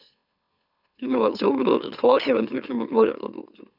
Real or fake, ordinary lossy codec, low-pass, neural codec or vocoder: fake; none; 5.4 kHz; autoencoder, 44.1 kHz, a latent of 192 numbers a frame, MeloTTS